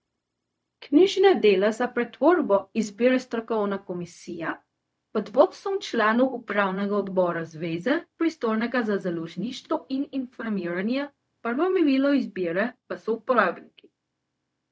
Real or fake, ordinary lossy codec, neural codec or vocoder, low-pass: fake; none; codec, 16 kHz, 0.4 kbps, LongCat-Audio-Codec; none